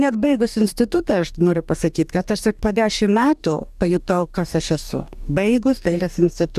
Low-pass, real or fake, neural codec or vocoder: 14.4 kHz; fake; codec, 44.1 kHz, 2.6 kbps, DAC